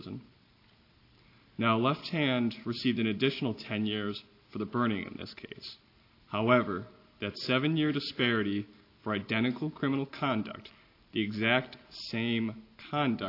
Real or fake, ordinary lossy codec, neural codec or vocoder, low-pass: real; AAC, 32 kbps; none; 5.4 kHz